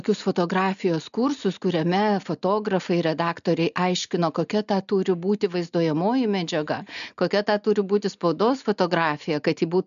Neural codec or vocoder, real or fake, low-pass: none; real; 7.2 kHz